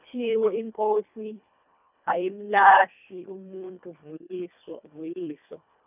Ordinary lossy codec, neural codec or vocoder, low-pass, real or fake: none; codec, 24 kHz, 1.5 kbps, HILCodec; 3.6 kHz; fake